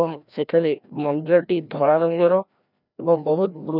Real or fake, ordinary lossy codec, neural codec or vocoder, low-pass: fake; none; codec, 16 kHz, 1 kbps, FreqCodec, larger model; 5.4 kHz